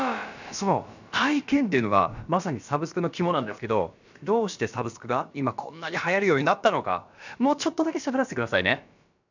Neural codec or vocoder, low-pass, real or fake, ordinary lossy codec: codec, 16 kHz, about 1 kbps, DyCAST, with the encoder's durations; 7.2 kHz; fake; none